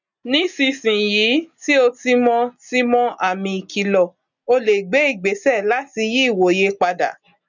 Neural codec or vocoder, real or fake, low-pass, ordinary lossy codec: none; real; 7.2 kHz; none